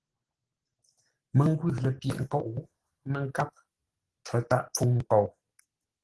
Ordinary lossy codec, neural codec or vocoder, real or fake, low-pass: Opus, 16 kbps; none; real; 10.8 kHz